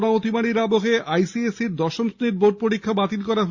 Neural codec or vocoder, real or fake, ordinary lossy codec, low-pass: none; real; Opus, 64 kbps; 7.2 kHz